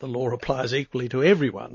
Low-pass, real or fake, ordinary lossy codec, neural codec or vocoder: 7.2 kHz; real; MP3, 32 kbps; none